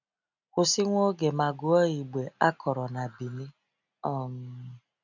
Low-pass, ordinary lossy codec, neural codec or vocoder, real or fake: 7.2 kHz; none; none; real